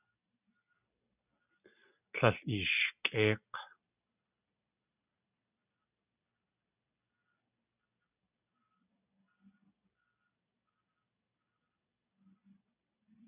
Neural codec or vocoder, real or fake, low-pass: none; real; 3.6 kHz